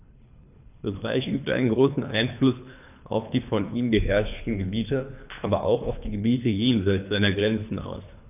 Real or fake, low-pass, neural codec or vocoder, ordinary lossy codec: fake; 3.6 kHz; codec, 24 kHz, 3 kbps, HILCodec; none